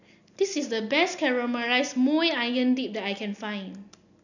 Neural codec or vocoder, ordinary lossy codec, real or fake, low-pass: none; none; real; 7.2 kHz